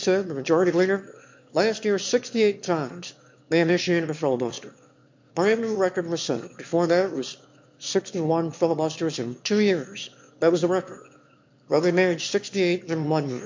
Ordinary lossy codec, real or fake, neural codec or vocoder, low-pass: MP3, 48 kbps; fake; autoencoder, 22.05 kHz, a latent of 192 numbers a frame, VITS, trained on one speaker; 7.2 kHz